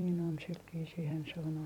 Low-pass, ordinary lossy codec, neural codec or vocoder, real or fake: 19.8 kHz; MP3, 96 kbps; vocoder, 44.1 kHz, 128 mel bands every 256 samples, BigVGAN v2; fake